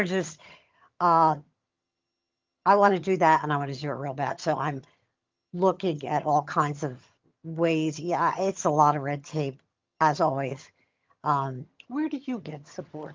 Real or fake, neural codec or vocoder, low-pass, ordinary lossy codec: fake; vocoder, 22.05 kHz, 80 mel bands, HiFi-GAN; 7.2 kHz; Opus, 24 kbps